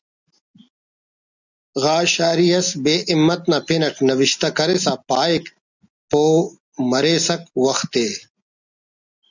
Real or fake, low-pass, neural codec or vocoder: real; 7.2 kHz; none